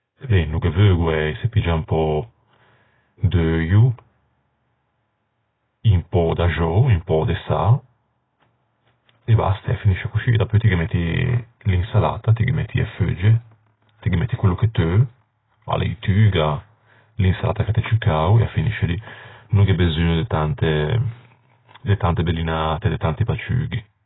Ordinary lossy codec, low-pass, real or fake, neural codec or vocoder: AAC, 16 kbps; 7.2 kHz; real; none